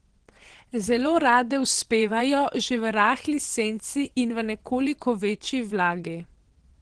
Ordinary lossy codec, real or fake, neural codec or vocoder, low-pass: Opus, 16 kbps; fake; vocoder, 22.05 kHz, 80 mel bands, WaveNeXt; 9.9 kHz